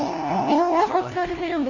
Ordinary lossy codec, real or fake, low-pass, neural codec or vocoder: none; fake; 7.2 kHz; codec, 16 kHz, 1 kbps, FunCodec, trained on LibriTTS, 50 frames a second